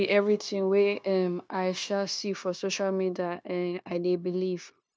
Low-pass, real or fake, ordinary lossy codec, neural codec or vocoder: none; fake; none; codec, 16 kHz, 0.9 kbps, LongCat-Audio-Codec